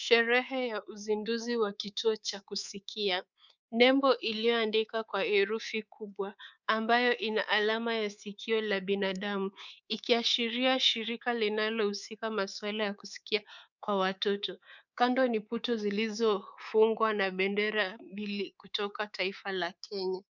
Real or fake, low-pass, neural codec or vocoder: fake; 7.2 kHz; codec, 24 kHz, 3.1 kbps, DualCodec